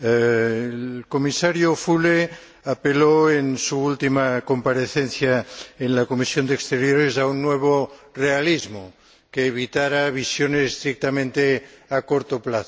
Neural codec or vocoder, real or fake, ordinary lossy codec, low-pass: none; real; none; none